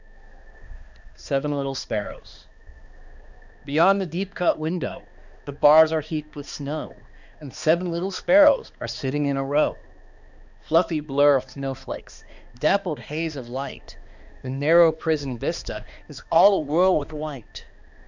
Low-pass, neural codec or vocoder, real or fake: 7.2 kHz; codec, 16 kHz, 2 kbps, X-Codec, HuBERT features, trained on balanced general audio; fake